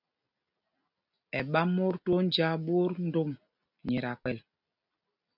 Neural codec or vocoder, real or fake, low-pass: none; real; 5.4 kHz